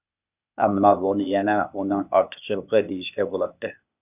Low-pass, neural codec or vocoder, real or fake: 3.6 kHz; codec, 16 kHz, 0.8 kbps, ZipCodec; fake